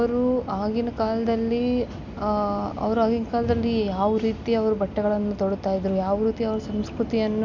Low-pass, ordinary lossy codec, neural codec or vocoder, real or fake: 7.2 kHz; MP3, 64 kbps; none; real